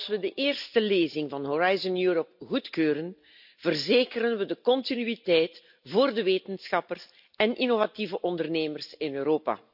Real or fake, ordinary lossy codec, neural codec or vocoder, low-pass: real; none; none; 5.4 kHz